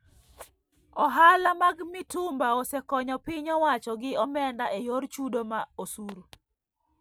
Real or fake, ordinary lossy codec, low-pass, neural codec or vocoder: real; none; none; none